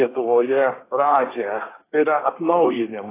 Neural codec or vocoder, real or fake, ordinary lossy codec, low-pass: codec, 24 kHz, 1 kbps, SNAC; fake; AAC, 16 kbps; 3.6 kHz